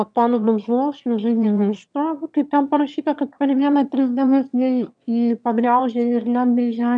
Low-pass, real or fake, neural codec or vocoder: 9.9 kHz; fake; autoencoder, 22.05 kHz, a latent of 192 numbers a frame, VITS, trained on one speaker